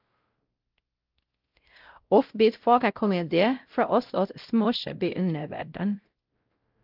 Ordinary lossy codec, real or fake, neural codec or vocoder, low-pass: Opus, 32 kbps; fake; codec, 16 kHz, 0.5 kbps, X-Codec, WavLM features, trained on Multilingual LibriSpeech; 5.4 kHz